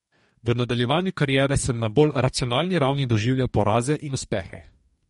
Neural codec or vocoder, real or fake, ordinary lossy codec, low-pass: codec, 44.1 kHz, 2.6 kbps, DAC; fake; MP3, 48 kbps; 19.8 kHz